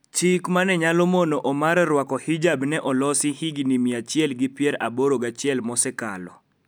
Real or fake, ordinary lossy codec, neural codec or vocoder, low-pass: real; none; none; none